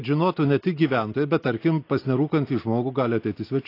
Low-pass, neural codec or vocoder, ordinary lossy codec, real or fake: 5.4 kHz; none; AAC, 32 kbps; real